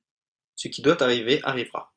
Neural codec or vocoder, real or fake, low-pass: none; real; 9.9 kHz